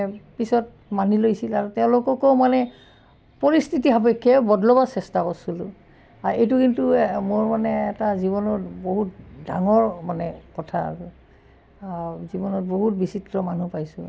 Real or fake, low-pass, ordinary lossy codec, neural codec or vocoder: real; none; none; none